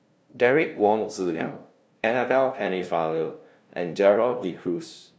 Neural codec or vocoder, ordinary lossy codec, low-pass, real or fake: codec, 16 kHz, 0.5 kbps, FunCodec, trained on LibriTTS, 25 frames a second; none; none; fake